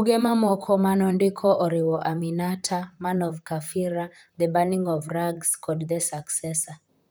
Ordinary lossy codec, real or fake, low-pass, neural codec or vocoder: none; fake; none; vocoder, 44.1 kHz, 128 mel bands, Pupu-Vocoder